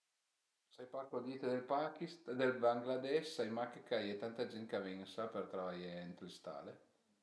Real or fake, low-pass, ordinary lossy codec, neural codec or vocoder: real; none; none; none